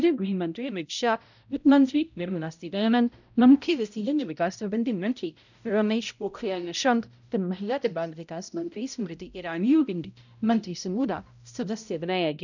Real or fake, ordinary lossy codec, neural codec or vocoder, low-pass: fake; none; codec, 16 kHz, 0.5 kbps, X-Codec, HuBERT features, trained on balanced general audio; 7.2 kHz